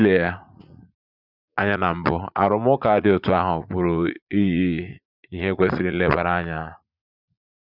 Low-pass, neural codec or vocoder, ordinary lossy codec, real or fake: 5.4 kHz; vocoder, 44.1 kHz, 80 mel bands, Vocos; none; fake